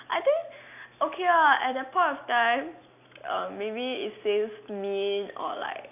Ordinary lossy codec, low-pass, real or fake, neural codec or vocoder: none; 3.6 kHz; real; none